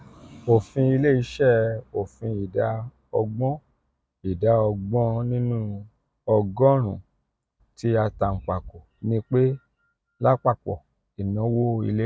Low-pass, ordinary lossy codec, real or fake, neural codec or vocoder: none; none; real; none